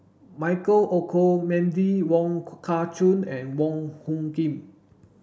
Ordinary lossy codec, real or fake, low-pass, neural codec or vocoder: none; real; none; none